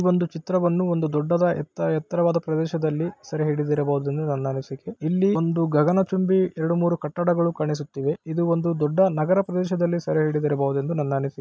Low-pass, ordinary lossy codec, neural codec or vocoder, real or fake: none; none; none; real